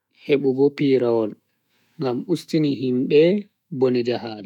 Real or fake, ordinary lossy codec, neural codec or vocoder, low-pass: fake; none; autoencoder, 48 kHz, 128 numbers a frame, DAC-VAE, trained on Japanese speech; 19.8 kHz